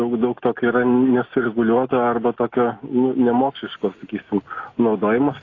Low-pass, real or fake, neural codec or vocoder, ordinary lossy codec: 7.2 kHz; real; none; AAC, 32 kbps